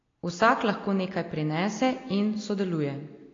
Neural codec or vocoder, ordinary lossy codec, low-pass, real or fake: none; AAC, 32 kbps; 7.2 kHz; real